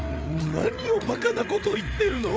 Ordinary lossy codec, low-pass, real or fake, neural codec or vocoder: none; none; fake; codec, 16 kHz, 8 kbps, FreqCodec, larger model